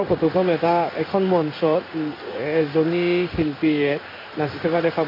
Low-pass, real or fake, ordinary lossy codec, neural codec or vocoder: 5.4 kHz; fake; MP3, 32 kbps; codec, 16 kHz in and 24 kHz out, 1 kbps, XY-Tokenizer